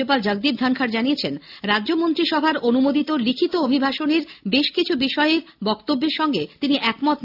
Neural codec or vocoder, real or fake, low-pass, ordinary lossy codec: none; real; 5.4 kHz; AAC, 48 kbps